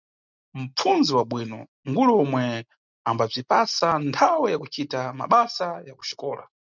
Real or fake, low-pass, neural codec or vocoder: real; 7.2 kHz; none